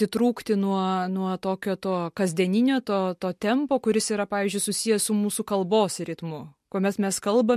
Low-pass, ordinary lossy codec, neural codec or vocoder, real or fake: 14.4 kHz; MP3, 64 kbps; none; real